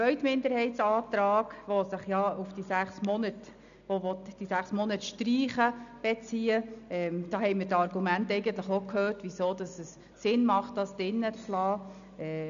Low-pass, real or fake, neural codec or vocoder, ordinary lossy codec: 7.2 kHz; real; none; MP3, 96 kbps